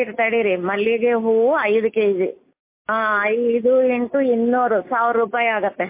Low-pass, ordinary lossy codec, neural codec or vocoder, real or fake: 3.6 kHz; MP3, 24 kbps; none; real